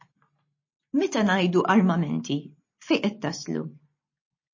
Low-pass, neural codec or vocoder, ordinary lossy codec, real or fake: 7.2 kHz; none; MP3, 32 kbps; real